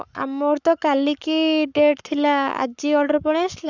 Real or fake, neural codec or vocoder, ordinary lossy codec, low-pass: fake; codec, 44.1 kHz, 7.8 kbps, Pupu-Codec; none; 7.2 kHz